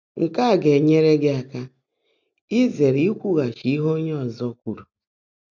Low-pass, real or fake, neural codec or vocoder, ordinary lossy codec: 7.2 kHz; real; none; none